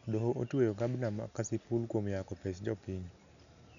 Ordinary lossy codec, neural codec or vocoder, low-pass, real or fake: none; none; 7.2 kHz; real